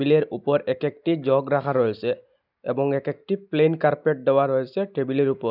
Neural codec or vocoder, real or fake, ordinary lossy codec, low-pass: none; real; none; 5.4 kHz